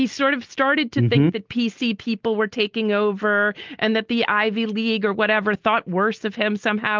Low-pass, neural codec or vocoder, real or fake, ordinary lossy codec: 7.2 kHz; none; real; Opus, 24 kbps